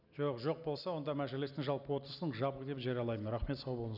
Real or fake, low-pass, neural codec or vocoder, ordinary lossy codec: real; 5.4 kHz; none; none